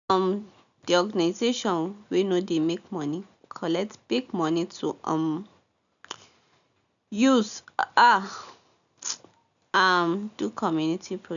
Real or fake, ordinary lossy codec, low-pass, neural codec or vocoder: real; none; 7.2 kHz; none